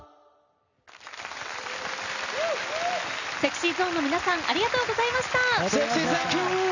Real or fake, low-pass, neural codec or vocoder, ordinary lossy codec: real; 7.2 kHz; none; none